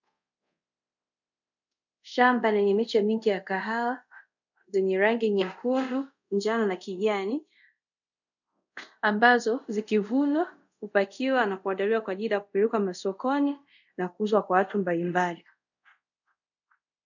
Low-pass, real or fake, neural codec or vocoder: 7.2 kHz; fake; codec, 24 kHz, 0.5 kbps, DualCodec